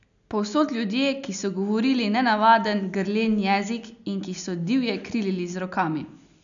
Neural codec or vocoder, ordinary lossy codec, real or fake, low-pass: none; none; real; 7.2 kHz